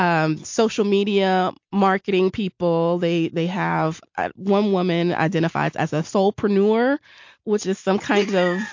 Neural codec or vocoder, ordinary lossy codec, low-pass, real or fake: none; MP3, 48 kbps; 7.2 kHz; real